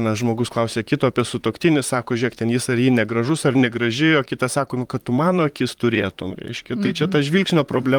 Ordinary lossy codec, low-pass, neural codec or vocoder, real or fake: Opus, 32 kbps; 19.8 kHz; vocoder, 44.1 kHz, 128 mel bands, Pupu-Vocoder; fake